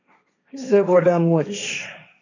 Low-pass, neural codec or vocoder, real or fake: 7.2 kHz; codec, 16 kHz, 1.1 kbps, Voila-Tokenizer; fake